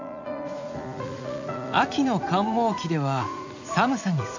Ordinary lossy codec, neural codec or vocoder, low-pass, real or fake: MP3, 64 kbps; vocoder, 44.1 kHz, 80 mel bands, Vocos; 7.2 kHz; fake